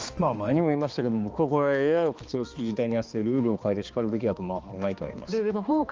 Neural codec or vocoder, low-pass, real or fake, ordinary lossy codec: codec, 16 kHz, 2 kbps, X-Codec, HuBERT features, trained on balanced general audio; 7.2 kHz; fake; Opus, 24 kbps